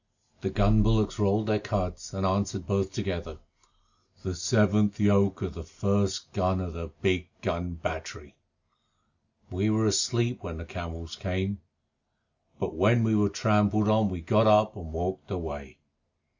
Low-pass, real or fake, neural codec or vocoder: 7.2 kHz; real; none